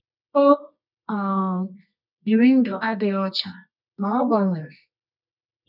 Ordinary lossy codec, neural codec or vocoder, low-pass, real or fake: none; codec, 24 kHz, 0.9 kbps, WavTokenizer, medium music audio release; 5.4 kHz; fake